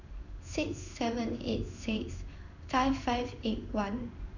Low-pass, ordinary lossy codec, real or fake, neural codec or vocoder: 7.2 kHz; none; fake; codec, 16 kHz in and 24 kHz out, 1 kbps, XY-Tokenizer